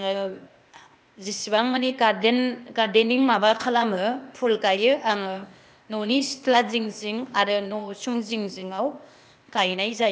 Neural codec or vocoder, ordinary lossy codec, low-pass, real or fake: codec, 16 kHz, 0.8 kbps, ZipCodec; none; none; fake